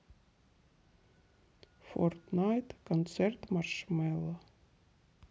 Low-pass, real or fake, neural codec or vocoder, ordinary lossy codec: none; real; none; none